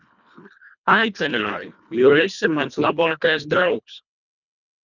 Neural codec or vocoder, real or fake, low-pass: codec, 24 kHz, 1.5 kbps, HILCodec; fake; 7.2 kHz